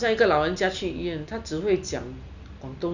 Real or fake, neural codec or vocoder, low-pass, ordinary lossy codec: real; none; 7.2 kHz; none